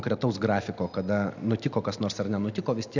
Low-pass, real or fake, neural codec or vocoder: 7.2 kHz; real; none